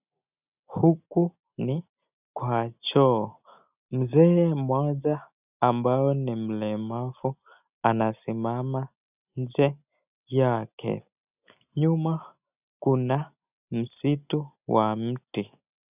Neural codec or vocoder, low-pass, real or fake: none; 3.6 kHz; real